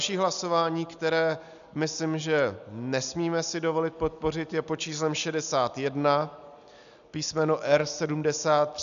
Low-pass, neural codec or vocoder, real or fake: 7.2 kHz; none; real